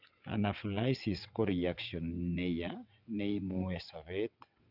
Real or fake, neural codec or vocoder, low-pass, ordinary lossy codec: fake; vocoder, 22.05 kHz, 80 mel bands, WaveNeXt; 5.4 kHz; none